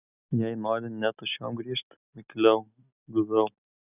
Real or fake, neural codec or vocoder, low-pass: real; none; 3.6 kHz